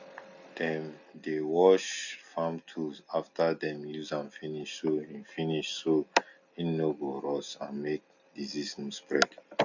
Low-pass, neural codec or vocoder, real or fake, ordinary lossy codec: 7.2 kHz; none; real; none